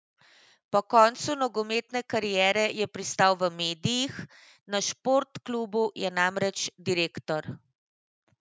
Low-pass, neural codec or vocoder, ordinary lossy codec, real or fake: none; none; none; real